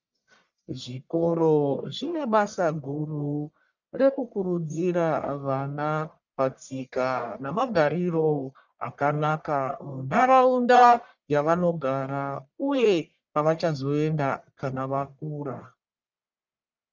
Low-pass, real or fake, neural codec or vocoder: 7.2 kHz; fake; codec, 44.1 kHz, 1.7 kbps, Pupu-Codec